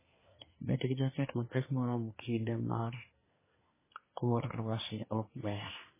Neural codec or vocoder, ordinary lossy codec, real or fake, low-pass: codec, 24 kHz, 1 kbps, SNAC; MP3, 16 kbps; fake; 3.6 kHz